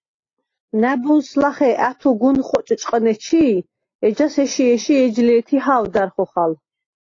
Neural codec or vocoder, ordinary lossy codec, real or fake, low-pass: none; AAC, 32 kbps; real; 7.2 kHz